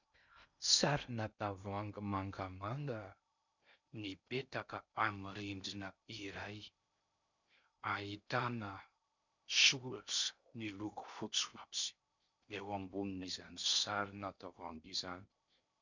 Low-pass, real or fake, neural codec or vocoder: 7.2 kHz; fake; codec, 16 kHz in and 24 kHz out, 0.6 kbps, FocalCodec, streaming, 2048 codes